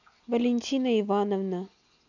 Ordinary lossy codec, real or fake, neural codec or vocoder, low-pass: none; real; none; 7.2 kHz